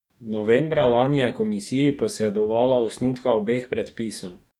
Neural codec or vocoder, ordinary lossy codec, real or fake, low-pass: codec, 44.1 kHz, 2.6 kbps, DAC; none; fake; 19.8 kHz